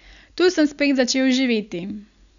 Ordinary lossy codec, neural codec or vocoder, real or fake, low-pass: none; none; real; 7.2 kHz